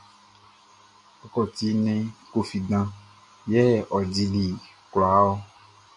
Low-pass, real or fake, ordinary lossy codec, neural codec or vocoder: 10.8 kHz; real; AAC, 64 kbps; none